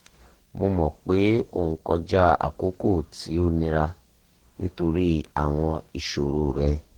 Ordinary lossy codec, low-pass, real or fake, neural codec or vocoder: Opus, 16 kbps; 19.8 kHz; fake; codec, 44.1 kHz, 2.6 kbps, DAC